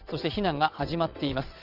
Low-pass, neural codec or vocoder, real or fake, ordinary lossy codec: 5.4 kHz; vocoder, 22.05 kHz, 80 mel bands, WaveNeXt; fake; Opus, 64 kbps